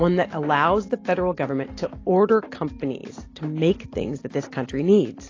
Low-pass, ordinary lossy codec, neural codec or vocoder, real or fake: 7.2 kHz; AAC, 32 kbps; none; real